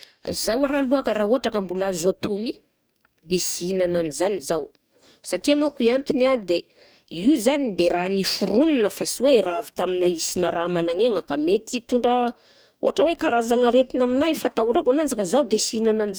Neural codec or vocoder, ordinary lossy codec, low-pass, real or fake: codec, 44.1 kHz, 2.6 kbps, DAC; none; none; fake